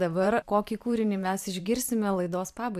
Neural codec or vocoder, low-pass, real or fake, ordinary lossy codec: vocoder, 44.1 kHz, 128 mel bands every 512 samples, BigVGAN v2; 14.4 kHz; fake; AAC, 96 kbps